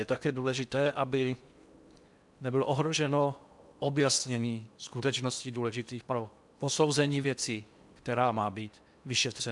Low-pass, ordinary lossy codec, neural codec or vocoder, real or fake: 10.8 kHz; MP3, 96 kbps; codec, 16 kHz in and 24 kHz out, 0.8 kbps, FocalCodec, streaming, 65536 codes; fake